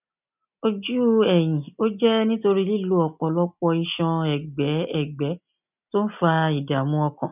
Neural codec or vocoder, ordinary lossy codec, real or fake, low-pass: none; none; real; 3.6 kHz